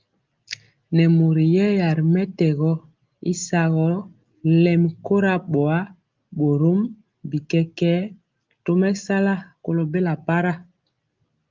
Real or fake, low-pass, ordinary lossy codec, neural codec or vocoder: real; 7.2 kHz; Opus, 32 kbps; none